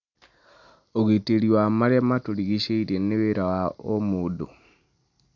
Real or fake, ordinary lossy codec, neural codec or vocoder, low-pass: real; none; none; 7.2 kHz